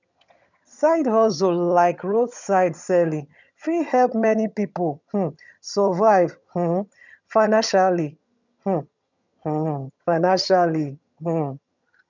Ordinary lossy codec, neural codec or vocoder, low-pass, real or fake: none; vocoder, 22.05 kHz, 80 mel bands, HiFi-GAN; 7.2 kHz; fake